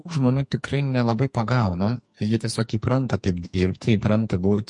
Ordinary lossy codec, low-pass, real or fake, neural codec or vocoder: MP3, 48 kbps; 10.8 kHz; fake; codec, 44.1 kHz, 2.6 kbps, SNAC